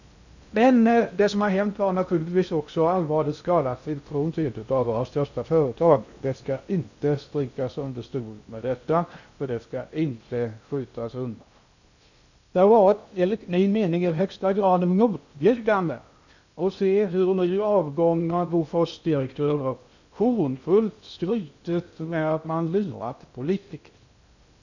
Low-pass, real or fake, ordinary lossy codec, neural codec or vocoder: 7.2 kHz; fake; none; codec, 16 kHz in and 24 kHz out, 0.6 kbps, FocalCodec, streaming, 2048 codes